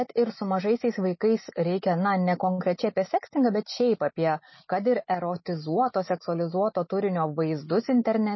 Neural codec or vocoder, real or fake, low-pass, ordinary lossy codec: none; real; 7.2 kHz; MP3, 24 kbps